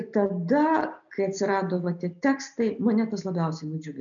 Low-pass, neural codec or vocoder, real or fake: 7.2 kHz; none; real